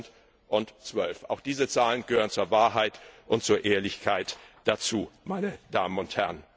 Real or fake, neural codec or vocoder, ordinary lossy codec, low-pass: real; none; none; none